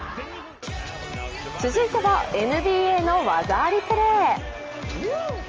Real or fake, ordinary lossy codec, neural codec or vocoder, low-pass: real; Opus, 24 kbps; none; 7.2 kHz